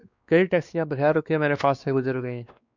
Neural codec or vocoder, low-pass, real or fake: codec, 16 kHz, 2 kbps, X-Codec, WavLM features, trained on Multilingual LibriSpeech; 7.2 kHz; fake